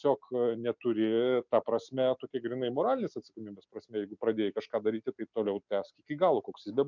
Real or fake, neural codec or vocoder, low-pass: real; none; 7.2 kHz